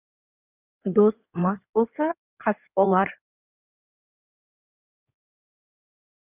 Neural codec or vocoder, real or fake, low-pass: codec, 16 kHz in and 24 kHz out, 2.2 kbps, FireRedTTS-2 codec; fake; 3.6 kHz